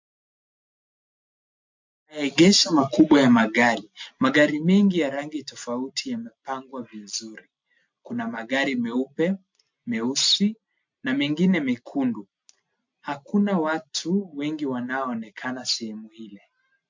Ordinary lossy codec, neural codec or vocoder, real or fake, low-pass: MP3, 48 kbps; none; real; 7.2 kHz